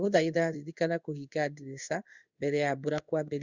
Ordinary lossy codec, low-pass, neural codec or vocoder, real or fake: Opus, 64 kbps; 7.2 kHz; codec, 16 kHz in and 24 kHz out, 1 kbps, XY-Tokenizer; fake